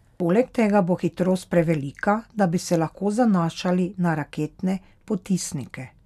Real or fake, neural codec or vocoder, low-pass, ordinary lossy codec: real; none; 14.4 kHz; none